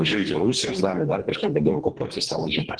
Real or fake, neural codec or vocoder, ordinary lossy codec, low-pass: fake; codec, 24 kHz, 1.5 kbps, HILCodec; Opus, 16 kbps; 9.9 kHz